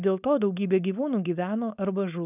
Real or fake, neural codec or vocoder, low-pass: fake; codec, 16 kHz, 4.8 kbps, FACodec; 3.6 kHz